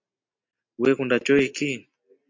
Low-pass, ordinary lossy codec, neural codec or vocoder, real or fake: 7.2 kHz; MP3, 48 kbps; none; real